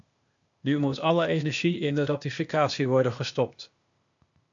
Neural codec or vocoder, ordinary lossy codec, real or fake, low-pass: codec, 16 kHz, 0.8 kbps, ZipCodec; MP3, 64 kbps; fake; 7.2 kHz